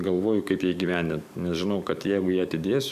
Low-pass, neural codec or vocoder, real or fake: 14.4 kHz; codec, 44.1 kHz, 7.8 kbps, DAC; fake